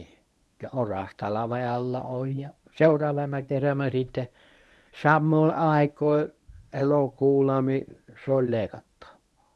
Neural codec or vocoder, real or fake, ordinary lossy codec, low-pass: codec, 24 kHz, 0.9 kbps, WavTokenizer, medium speech release version 1; fake; none; none